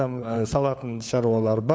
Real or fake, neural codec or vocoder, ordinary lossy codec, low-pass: fake; codec, 16 kHz, 4 kbps, FunCodec, trained on LibriTTS, 50 frames a second; none; none